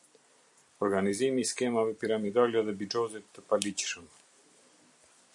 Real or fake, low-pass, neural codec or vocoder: fake; 10.8 kHz; vocoder, 44.1 kHz, 128 mel bands every 256 samples, BigVGAN v2